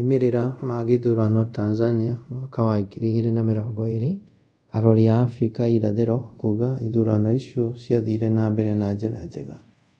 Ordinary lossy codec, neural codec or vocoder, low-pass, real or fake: none; codec, 24 kHz, 0.5 kbps, DualCodec; 10.8 kHz; fake